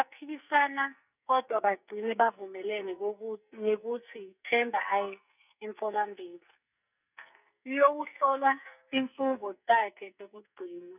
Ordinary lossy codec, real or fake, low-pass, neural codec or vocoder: none; fake; 3.6 kHz; codec, 44.1 kHz, 2.6 kbps, SNAC